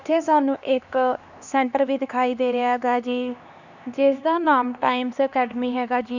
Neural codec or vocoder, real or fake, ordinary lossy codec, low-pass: codec, 16 kHz, 2 kbps, X-Codec, HuBERT features, trained on LibriSpeech; fake; none; 7.2 kHz